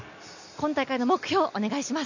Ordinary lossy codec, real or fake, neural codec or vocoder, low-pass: none; real; none; 7.2 kHz